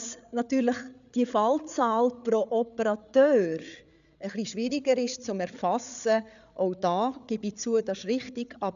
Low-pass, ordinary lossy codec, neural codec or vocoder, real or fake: 7.2 kHz; none; codec, 16 kHz, 8 kbps, FreqCodec, larger model; fake